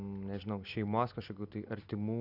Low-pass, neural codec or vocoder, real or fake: 5.4 kHz; none; real